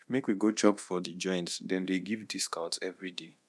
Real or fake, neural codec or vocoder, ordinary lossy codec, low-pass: fake; codec, 24 kHz, 0.9 kbps, DualCodec; none; none